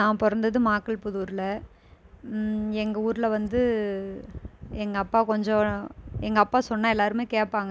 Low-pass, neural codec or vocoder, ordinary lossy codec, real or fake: none; none; none; real